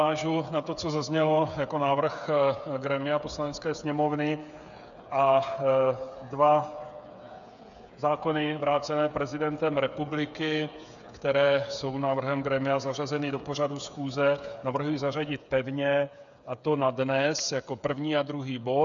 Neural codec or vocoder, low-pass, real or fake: codec, 16 kHz, 8 kbps, FreqCodec, smaller model; 7.2 kHz; fake